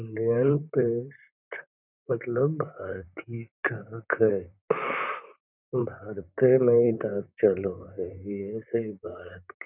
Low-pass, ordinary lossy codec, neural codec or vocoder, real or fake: 3.6 kHz; none; vocoder, 44.1 kHz, 128 mel bands, Pupu-Vocoder; fake